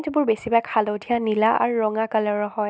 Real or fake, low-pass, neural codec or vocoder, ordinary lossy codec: real; none; none; none